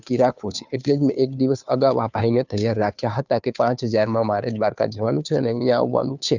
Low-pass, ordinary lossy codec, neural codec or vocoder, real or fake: 7.2 kHz; none; codec, 16 kHz, 2 kbps, FunCodec, trained on Chinese and English, 25 frames a second; fake